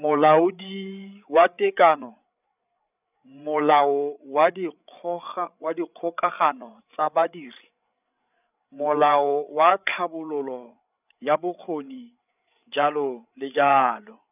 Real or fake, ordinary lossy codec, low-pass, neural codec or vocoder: fake; none; 3.6 kHz; codec, 16 kHz, 8 kbps, FreqCodec, larger model